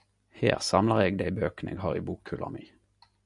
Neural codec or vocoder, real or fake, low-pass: none; real; 10.8 kHz